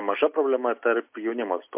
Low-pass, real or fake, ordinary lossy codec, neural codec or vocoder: 3.6 kHz; real; MP3, 32 kbps; none